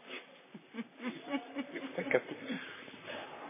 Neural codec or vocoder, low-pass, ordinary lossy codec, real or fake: vocoder, 44.1 kHz, 128 mel bands, Pupu-Vocoder; 3.6 kHz; MP3, 16 kbps; fake